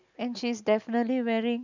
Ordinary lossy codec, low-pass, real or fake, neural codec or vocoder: none; 7.2 kHz; real; none